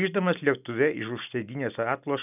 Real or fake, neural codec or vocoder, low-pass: real; none; 3.6 kHz